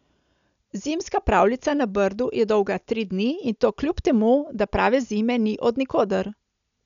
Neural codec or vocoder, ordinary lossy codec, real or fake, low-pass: none; none; real; 7.2 kHz